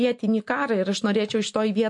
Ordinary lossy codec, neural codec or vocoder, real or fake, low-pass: MP3, 48 kbps; none; real; 10.8 kHz